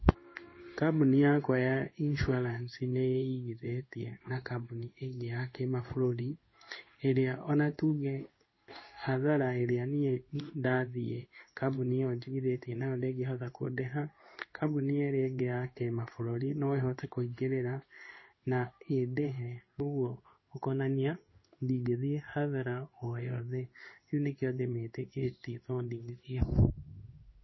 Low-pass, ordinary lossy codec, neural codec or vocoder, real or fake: 7.2 kHz; MP3, 24 kbps; codec, 16 kHz in and 24 kHz out, 1 kbps, XY-Tokenizer; fake